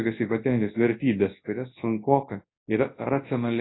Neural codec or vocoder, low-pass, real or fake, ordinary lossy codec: codec, 24 kHz, 0.9 kbps, WavTokenizer, large speech release; 7.2 kHz; fake; AAC, 16 kbps